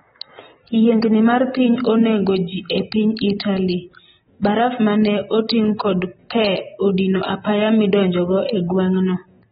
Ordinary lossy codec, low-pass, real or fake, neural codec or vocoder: AAC, 16 kbps; 19.8 kHz; real; none